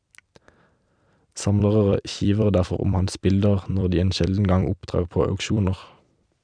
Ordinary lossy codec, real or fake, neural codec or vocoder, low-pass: none; fake; vocoder, 44.1 kHz, 128 mel bands every 256 samples, BigVGAN v2; 9.9 kHz